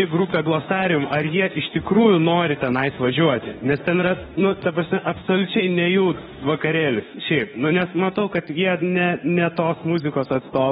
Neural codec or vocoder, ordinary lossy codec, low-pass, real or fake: autoencoder, 48 kHz, 32 numbers a frame, DAC-VAE, trained on Japanese speech; AAC, 16 kbps; 19.8 kHz; fake